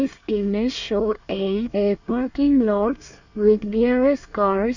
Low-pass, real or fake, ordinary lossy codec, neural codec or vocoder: 7.2 kHz; fake; none; codec, 24 kHz, 1 kbps, SNAC